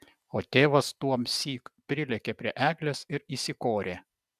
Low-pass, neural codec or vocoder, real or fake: 14.4 kHz; none; real